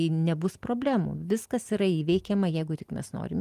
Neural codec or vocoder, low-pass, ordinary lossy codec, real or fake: none; 14.4 kHz; Opus, 24 kbps; real